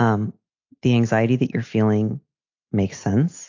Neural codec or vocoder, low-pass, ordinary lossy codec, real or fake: none; 7.2 kHz; AAC, 48 kbps; real